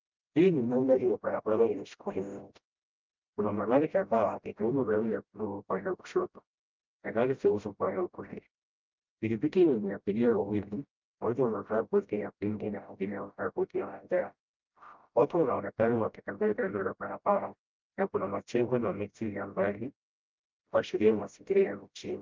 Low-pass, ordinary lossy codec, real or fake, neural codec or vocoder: 7.2 kHz; Opus, 24 kbps; fake; codec, 16 kHz, 0.5 kbps, FreqCodec, smaller model